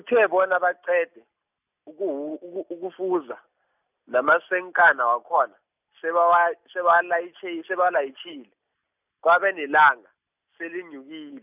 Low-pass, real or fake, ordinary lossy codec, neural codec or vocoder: 3.6 kHz; real; none; none